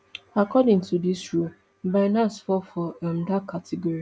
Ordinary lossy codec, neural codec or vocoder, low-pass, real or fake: none; none; none; real